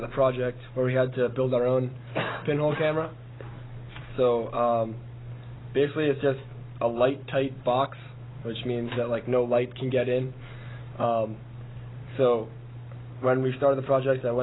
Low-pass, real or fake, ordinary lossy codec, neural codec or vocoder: 7.2 kHz; real; AAC, 16 kbps; none